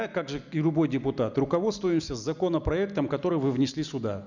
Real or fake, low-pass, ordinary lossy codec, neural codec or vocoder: real; 7.2 kHz; none; none